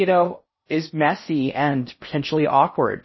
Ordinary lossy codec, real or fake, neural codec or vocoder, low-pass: MP3, 24 kbps; fake; codec, 16 kHz in and 24 kHz out, 0.6 kbps, FocalCodec, streaming, 4096 codes; 7.2 kHz